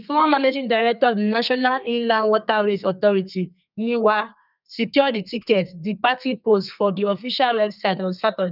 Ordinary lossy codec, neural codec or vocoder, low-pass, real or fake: none; codec, 32 kHz, 1.9 kbps, SNAC; 5.4 kHz; fake